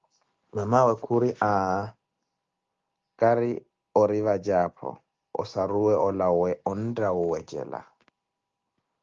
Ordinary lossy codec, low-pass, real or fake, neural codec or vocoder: Opus, 32 kbps; 7.2 kHz; real; none